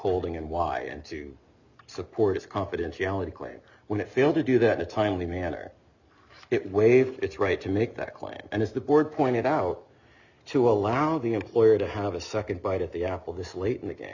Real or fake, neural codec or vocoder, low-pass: real; none; 7.2 kHz